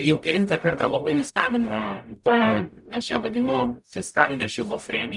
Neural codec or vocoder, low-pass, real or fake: codec, 44.1 kHz, 0.9 kbps, DAC; 10.8 kHz; fake